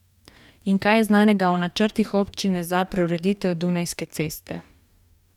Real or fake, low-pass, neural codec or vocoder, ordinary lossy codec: fake; 19.8 kHz; codec, 44.1 kHz, 2.6 kbps, DAC; none